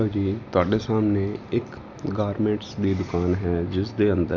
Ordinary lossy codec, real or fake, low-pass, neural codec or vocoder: none; real; 7.2 kHz; none